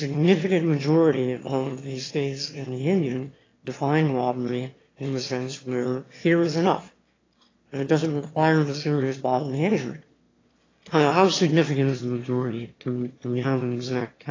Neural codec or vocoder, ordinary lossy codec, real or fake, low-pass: autoencoder, 22.05 kHz, a latent of 192 numbers a frame, VITS, trained on one speaker; AAC, 32 kbps; fake; 7.2 kHz